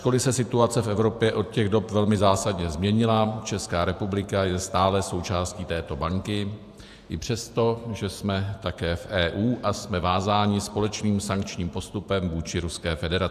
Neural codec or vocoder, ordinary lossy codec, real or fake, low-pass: vocoder, 48 kHz, 128 mel bands, Vocos; AAC, 96 kbps; fake; 14.4 kHz